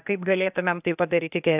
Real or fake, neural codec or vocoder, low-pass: fake; codec, 16 kHz, 0.8 kbps, ZipCodec; 3.6 kHz